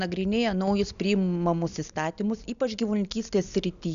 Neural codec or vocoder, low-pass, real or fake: none; 7.2 kHz; real